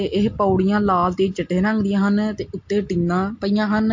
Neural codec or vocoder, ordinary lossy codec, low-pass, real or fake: none; MP3, 48 kbps; 7.2 kHz; real